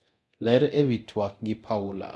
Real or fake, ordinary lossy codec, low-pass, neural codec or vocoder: fake; none; none; codec, 24 kHz, 0.9 kbps, DualCodec